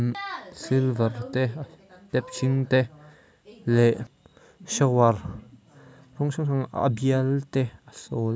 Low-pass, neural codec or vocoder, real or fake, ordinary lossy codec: none; none; real; none